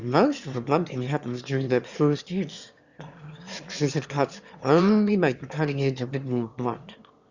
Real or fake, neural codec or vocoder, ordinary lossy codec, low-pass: fake; autoencoder, 22.05 kHz, a latent of 192 numbers a frame, VITS, trained on one speaker; Opus, 64 kbps; 7.2 kHz